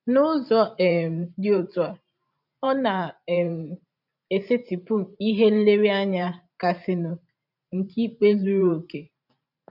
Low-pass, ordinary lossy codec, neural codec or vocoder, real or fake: 5.4 kHz; none; vocoder, 44.1 kHz, 128 mel bands every 512 samples, BigVGAN v2; fake